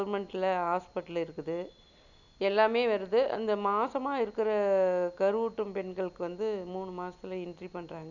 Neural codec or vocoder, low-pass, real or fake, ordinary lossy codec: none; 7.2 kHz; real; none